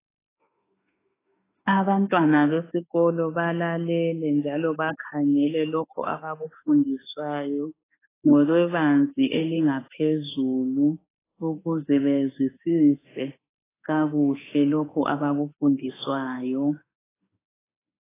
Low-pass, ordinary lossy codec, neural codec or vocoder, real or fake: 3.6 kHz; AAC, 16 kbps; autoencoder, 48 kHz, 32 numbers a frame, DAC-VAE, trained on Japanese speech; fake